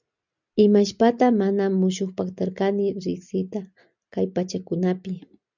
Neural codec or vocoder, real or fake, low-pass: none; real; 7.2 kHz